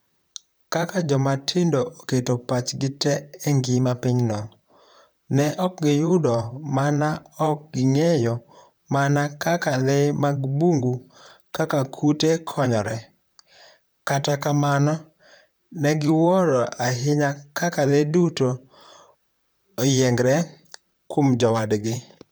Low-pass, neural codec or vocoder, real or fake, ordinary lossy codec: none; vocoder, 44.1 kHz, 128 mel bands, Pupu-Vocoder; fake; none